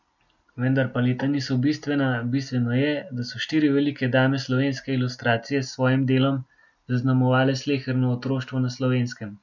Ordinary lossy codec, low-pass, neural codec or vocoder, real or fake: none; 7.2 kHz; none; real